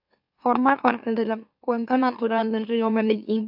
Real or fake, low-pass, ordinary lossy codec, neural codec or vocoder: fake; 5.4 kHz; AAC, 48 kbps; autoencoder, 44.1 kHz, a latent of 192 numbers a frame, MeloTTS